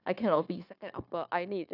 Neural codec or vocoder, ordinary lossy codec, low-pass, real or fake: codec, 16 kHz, 0.9 kbps, LongCat-Audio-Codec; none; 5.4 kHz; fake